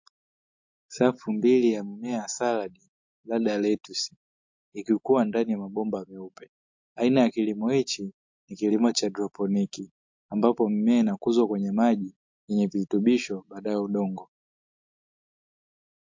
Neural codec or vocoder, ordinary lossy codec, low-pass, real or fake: none; MP3, 48 kbps; 7.2 kHz; real